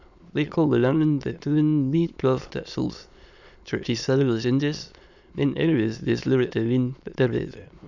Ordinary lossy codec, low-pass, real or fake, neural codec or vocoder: none; 7.2 kHz; fake; autoencoder, 22.05 kHz, a latent of 192 numbers a frame, VITS, trained on many speakers